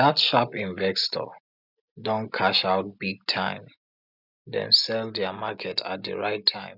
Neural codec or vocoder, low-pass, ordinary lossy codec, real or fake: none; 5.4 kHz; none; real